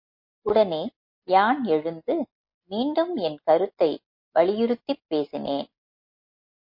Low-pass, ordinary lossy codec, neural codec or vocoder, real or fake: 5.4 kHz; MP3, 32 kbps; none; real